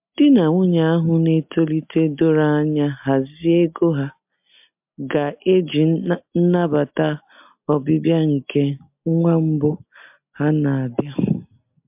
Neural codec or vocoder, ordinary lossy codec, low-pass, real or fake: none; MP3, 32 kbps; 3.6 kHz; real